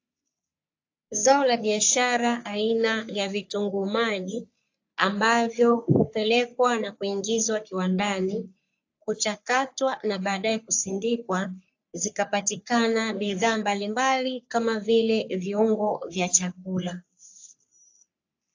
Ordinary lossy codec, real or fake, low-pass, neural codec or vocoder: AAC, 48 kbps; fake; 7.2 kHz; codec, 44.1 kHz, 3.4 kbps, Pupu-Codec